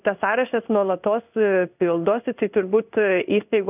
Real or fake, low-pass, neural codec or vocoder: fake; 3.6 kHz; codec, 16 kHz in and 24 kHz out, 1 kbps, XY-Tokenizer